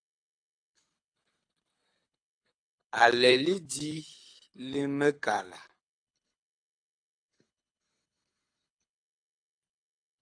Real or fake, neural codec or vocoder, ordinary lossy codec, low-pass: fake; codec, 24 kHz, 6 kbps, HILCodec; AAC, 64 kbps; 9.9 kHz